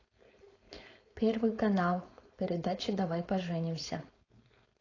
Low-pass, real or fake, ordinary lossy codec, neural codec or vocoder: 7.2 kHz; fake; AAC, 32 kbps; codec, 16 kHz, 4.8 kbps, FACodec